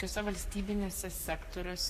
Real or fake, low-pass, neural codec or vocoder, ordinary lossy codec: fake; 14.4 kHz; codec, 44.1 kHz, 7.8 kbps, Pupu-Codec; AAC, 64 kbps